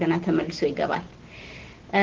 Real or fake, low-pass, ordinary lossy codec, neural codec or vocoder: real; 7.2 kHz; Opus, 16 kbps; none